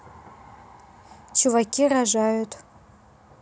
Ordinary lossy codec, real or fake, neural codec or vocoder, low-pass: none; real; none; none